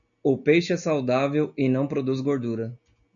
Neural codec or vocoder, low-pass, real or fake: none; 7.2 kHz; real